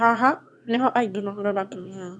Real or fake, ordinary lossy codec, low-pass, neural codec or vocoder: fake; none; none; autoencoder, 22.05 kHz, a latent of 192 numbers a frame, VITS, trained on one speaker